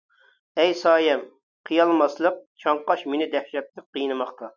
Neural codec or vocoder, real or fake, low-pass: none; real; 7.2 kHz